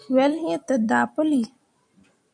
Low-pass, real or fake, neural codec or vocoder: 9.9 kHz; fake; vocoder, 44.1 kHz, 128 mel bands every 256 samples, BigVGAN v2